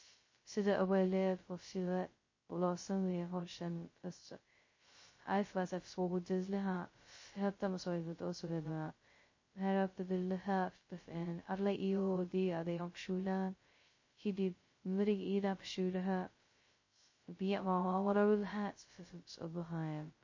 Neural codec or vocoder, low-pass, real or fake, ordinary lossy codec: codec, 16 kHz, 0.2 kbps, FocalCodec; 7.2 kHz; fake; MP3, 32 kbps